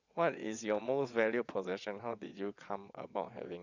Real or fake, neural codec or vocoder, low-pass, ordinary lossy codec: fake; vocoder, 22.05 kHz, 80 mel bands, WaveNeXt; 7.2 kHz; none